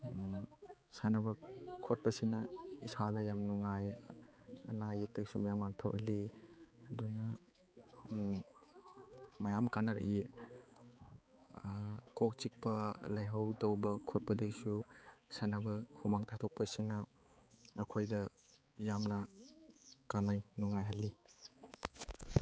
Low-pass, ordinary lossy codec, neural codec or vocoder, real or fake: none; none; codec, 16 kHz, 4 kbps, X-Codec, HuBERT features, trained on balanced general audio; fake